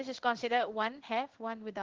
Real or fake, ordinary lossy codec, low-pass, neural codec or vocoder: fake; Opus, 16 kbps; 7.2 kHz; codec, 16 kHz in and 24 kHz out, 1 kbps, XY-Tokenizer